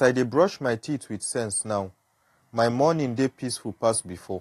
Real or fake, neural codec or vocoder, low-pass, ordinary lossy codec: real; none; 14.4 kHz; AAC, 48 kbps